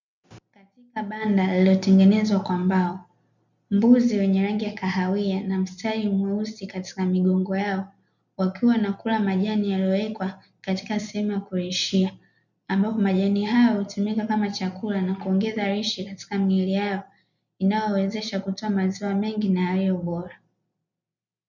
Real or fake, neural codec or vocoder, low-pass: real; none; 7.2 kHz